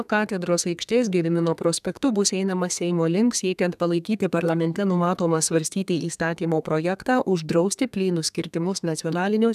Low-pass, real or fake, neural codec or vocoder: 14.4 kHz; fake; codec, 32 kHz, 1.9 kbps, SNAC